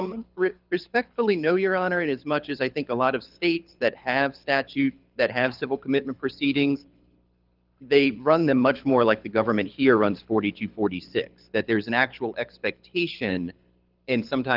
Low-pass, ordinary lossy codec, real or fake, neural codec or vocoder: 5.4 kHz; Opus, 32 kbps; fake; codec, 24 kHz, 6 kbps, HILCodec